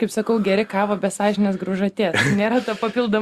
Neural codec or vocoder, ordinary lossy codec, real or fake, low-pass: none; Opus, 64 kbps; real; 14.4 kHz